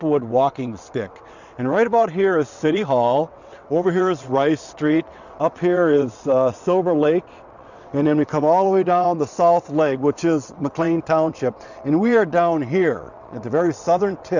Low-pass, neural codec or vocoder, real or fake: 7.2 kHz; vocoder, 22.05 kHz, 80 mel bands, WaveNeXt; fake